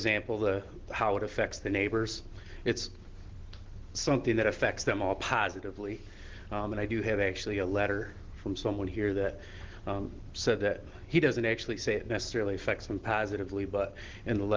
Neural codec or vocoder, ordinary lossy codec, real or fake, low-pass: none; Opus, 16 kbps; real; 7.2 kHz